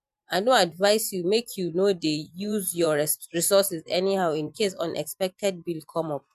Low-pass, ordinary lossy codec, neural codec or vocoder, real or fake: 14.4 kHz; MP3, 96 kbps; vocoder, 44.1 kHz, 128 mel bands every 512 samples, BigVGAN v2; fake